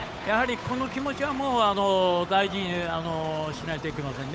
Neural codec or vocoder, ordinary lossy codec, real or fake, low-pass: codec, 16 kHz, 8 kbps, FunCodec, trained on Chinese and English, 25 frames a second; none; fake; none